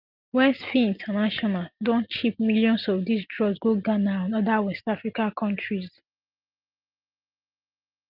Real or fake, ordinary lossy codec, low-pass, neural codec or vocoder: real; Opus, 24 kbps; 5.4 kHz; none